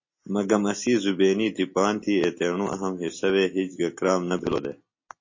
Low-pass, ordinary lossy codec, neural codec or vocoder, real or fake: 7.2 kHz; MP3, 32 kbps; none; real